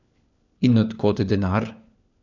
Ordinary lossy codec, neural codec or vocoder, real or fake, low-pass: none; codec, 16 kHz, 4 kbps, FunCodec, trained on LibriTTS, 50 frames a second; fake; 7.2 kHz